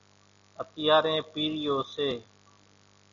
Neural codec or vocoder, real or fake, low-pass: none; real; 7.2 kHz